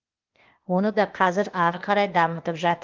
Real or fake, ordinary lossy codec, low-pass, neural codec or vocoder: fake; Opus, 24 kbps; 7.2 kHz; codec, 16 kHz, 0.8 kbps, ZipCodec